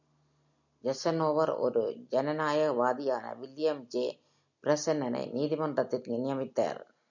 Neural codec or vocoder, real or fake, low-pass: none; real; 7.2 kHz